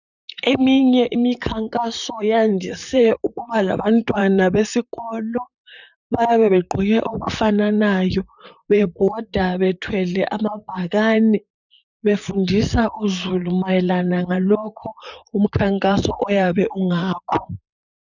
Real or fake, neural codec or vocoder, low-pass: fake; vocoder, 44.1 kHz, 128 mel bands, Pupu-Vocoder; 7.2 kHz